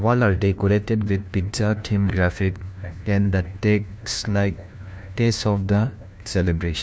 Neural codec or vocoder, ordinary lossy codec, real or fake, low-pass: codec, 16 kHz, 1 kbps, FunCodec, trained on LibriTTS, 50 frames a second; none; fake; none